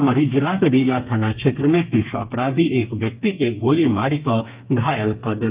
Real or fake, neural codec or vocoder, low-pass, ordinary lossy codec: fake; codec, 32 kHz, 1.9 kbps, SNAC; 3.6 kHz; Opus, 24 kbps